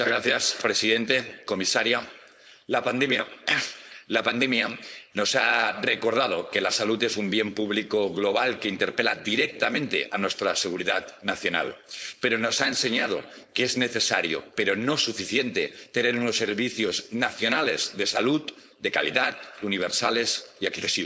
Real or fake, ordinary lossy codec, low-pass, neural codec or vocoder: fake; none; none; codec, 16 kHz, 4.8 kbps, FACodec